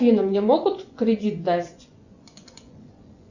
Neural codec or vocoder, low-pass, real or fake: vocoder, 24 kHz, 100 mel bands, Vocos; 7.2 kHz; fake